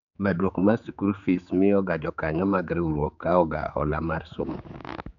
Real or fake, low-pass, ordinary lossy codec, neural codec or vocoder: fake; 7.2 kHz; none; codec, 16 kHz, 4 kbps, X-Codec, HuBERT features, trained on general audio